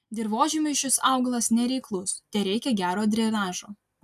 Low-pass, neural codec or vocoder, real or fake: 14.4 kHz; none; real